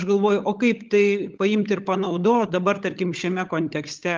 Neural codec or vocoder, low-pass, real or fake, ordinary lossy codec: codec, 16 kHz, 16 kbps, FunCodec, trained on LibriTTS, 50 frames a second; 7.2 kHz; fake; Opus, 32 kbps